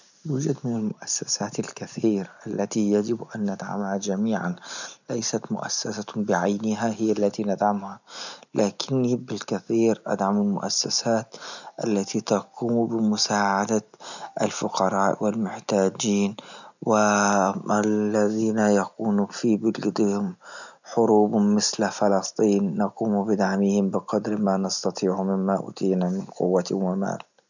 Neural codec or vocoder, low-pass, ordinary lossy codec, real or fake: none; 7.2 kHz; none; real